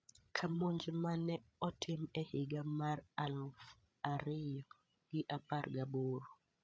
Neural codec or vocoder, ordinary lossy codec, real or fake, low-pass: codec, 16 kHz, 16 kbps, FreqCodec, larger model; none; fake; none